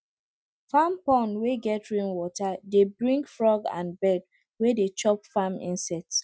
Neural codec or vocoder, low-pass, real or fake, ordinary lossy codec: none; none; real; none